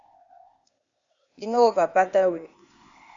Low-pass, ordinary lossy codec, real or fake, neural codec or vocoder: 7.2 kHz; AAC, 64 kbps; fake; codec, 16 kHz, 0.8 kbps, ZipCodec